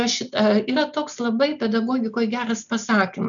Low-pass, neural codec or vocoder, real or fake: 7.2 kHz; none; real